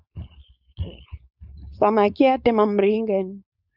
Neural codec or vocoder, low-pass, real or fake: codec, 16 kHz, 4.8 kbps, FACodec; 5.4 kHz; fake